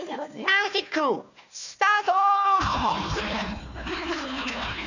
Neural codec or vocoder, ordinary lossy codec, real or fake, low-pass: codec, 16 kHz, 1 kbps, FunCodec, trained on Chinese and English, 50 frames a second; none; fake; 7.2 kHz